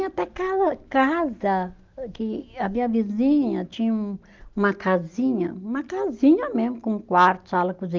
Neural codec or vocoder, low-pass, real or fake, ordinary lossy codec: none; 7.2 kHz; real; Opus, 32 kbps